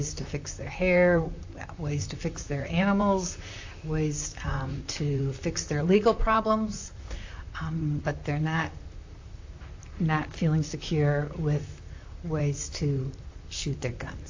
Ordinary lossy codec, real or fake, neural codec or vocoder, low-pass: AAC, 48 kbps; fake; vocoder, 44.1 kHz, 128 mel bands, Pupu-Vocoder; 7.2 kHz